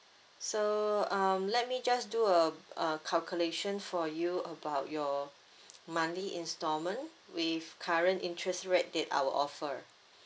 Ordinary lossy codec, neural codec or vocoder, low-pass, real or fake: none; none; none; real